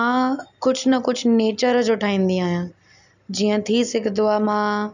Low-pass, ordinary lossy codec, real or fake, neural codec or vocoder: 7.2 kHz; none; real; none